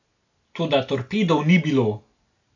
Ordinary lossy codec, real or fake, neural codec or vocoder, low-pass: AAC, 48 kbps; real; none; 7.2 kHz